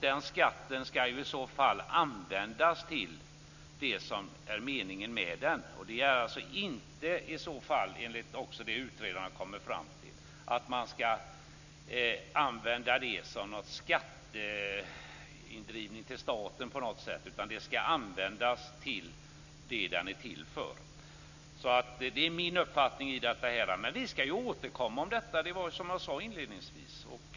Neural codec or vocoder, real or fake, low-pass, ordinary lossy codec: none; real; 7.2 kHz; none